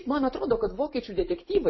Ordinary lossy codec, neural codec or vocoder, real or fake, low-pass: MP3, 24 kbps; none; real; 7.2 kHz